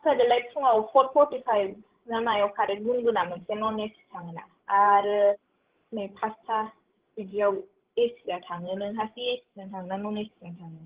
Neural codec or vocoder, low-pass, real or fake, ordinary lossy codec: codec, 16 kHz, 16 kbps, FreqCodec, larger model; 3.6 kHz; fake; Opus, 16 kbps